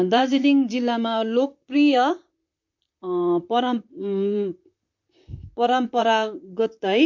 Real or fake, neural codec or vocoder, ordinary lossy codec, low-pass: fake; vocoder, 44.1 kHz, 128 mel bands, Pupu-Vocoder; MP3, 48 kbps; 7.2 kHz